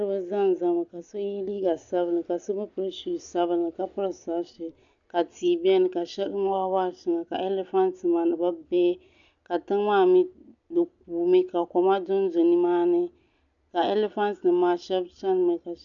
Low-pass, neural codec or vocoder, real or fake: 7.2 kHz; none; real